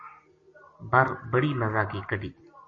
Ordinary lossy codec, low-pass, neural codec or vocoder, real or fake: MP3, 32 kbps; 7.2 kHz; none; real